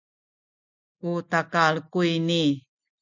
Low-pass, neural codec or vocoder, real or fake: 7.2 kHz; none; real